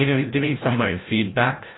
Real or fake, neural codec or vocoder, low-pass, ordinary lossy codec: fake; codec, 16 kHz, 0.5 kbps, FreqCodec, larger model; 7.2 kHz; AAC, 16 kbps